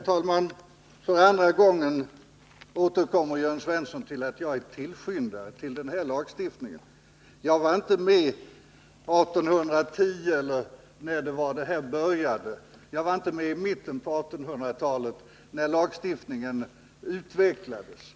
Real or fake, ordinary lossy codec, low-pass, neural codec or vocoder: real; none; none; none